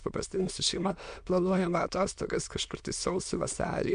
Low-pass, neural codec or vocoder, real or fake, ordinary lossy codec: 9.9 kHz; autoencoder, 22.05 kHz, a latent of 192 numbers a frame, VITS, trained on many speakers; fake; MP3, 64 kbps